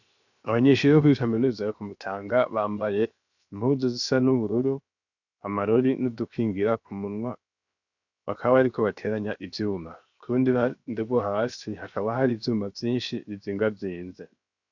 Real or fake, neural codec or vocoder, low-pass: fake; codec, 16 kHz, 0.7 kbps, FocalCodec; 7.2 kHz